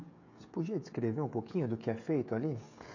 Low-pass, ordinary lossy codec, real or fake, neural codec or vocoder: 7.2 kHz; none; fake; codec, 16 kHz, 16 kbps, FreqCodec, smaller model